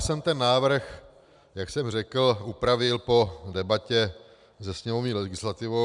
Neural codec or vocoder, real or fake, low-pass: none; real; 10.8 kHz